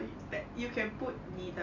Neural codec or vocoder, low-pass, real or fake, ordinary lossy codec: none; 7.2 kHz; real; none